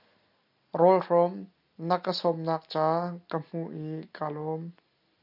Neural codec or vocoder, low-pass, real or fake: none; 5.4 kHz; real